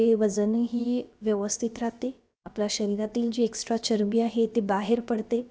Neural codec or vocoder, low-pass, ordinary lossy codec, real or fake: codec, 16 kHz, about 1 kbps, DyCAST, with the encoder's durations; none; none; fake